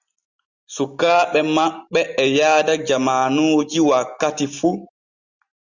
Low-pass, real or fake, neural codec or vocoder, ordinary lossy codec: 7.2 kHz; real; none; Opus, 64 kbps